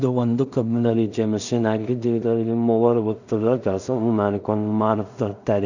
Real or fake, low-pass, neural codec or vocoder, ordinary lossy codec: fake; 7.2 kHz; codec, 16 kHz in and 24 kHz out, 0.4 kbps, LongCat-Audio-Codec, two codebook decoder; none